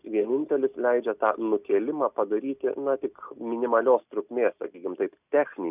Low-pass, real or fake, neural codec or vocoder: 3.6 kHz; real; none